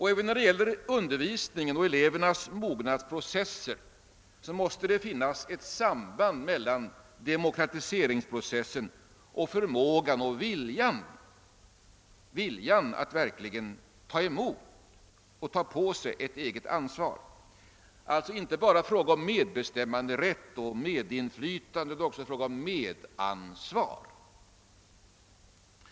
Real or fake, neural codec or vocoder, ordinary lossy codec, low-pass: real; none; none; none